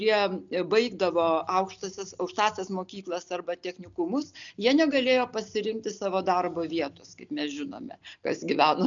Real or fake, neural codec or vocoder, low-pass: real; none; 7.2 kHz